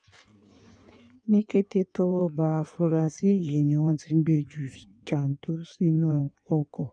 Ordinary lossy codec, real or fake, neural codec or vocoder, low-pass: none; fake; codec, 16 kHz in and 24 kHz out, 1.1 kbps, FireRedTTS-2 codec; 9.9 kHz